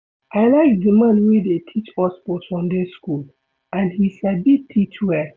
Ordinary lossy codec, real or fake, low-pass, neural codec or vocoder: none; real; none; none